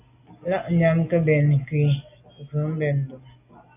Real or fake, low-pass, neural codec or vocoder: real; 3.6 kHz; none